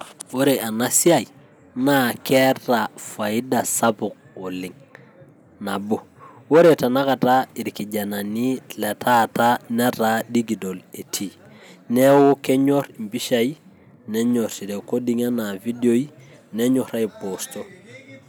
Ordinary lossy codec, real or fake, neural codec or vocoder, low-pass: none; real; none; none